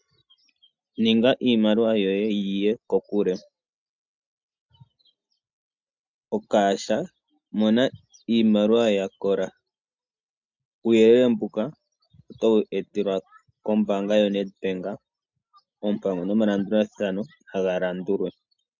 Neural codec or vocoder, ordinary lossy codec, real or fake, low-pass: none; MP3, 64 kbps; real; 7.2 kHz